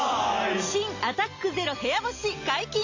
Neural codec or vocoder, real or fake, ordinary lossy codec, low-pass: none; real; AAC, 48 kbps; 7.2 kHz